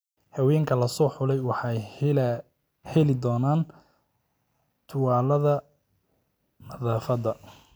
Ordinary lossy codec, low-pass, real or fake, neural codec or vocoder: none; none; real; none